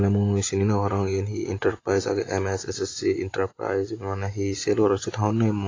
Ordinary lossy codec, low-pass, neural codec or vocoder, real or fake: AAC, 32 kbps; 7.2 kHz; none; real